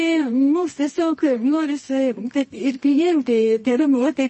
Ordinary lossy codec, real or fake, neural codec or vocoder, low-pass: MP3, 32 kbps; fake; codec, 24 kHz, 0.9 kbps, WavTokenizer, medium music audio release; 10.8 kHz